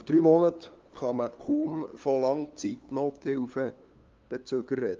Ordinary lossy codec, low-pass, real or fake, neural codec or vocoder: Opus, 32 kbps; 7.2 kHz; fake; codec, 16 kHz, 2 kbps, FunCodec, trained on LibriTTS, 25 frames a second